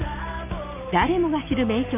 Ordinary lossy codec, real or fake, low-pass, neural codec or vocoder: none; real; 3.6 kHz; none